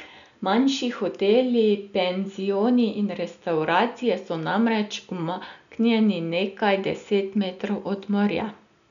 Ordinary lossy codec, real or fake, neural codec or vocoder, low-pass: none; real; none; 7.2 kHz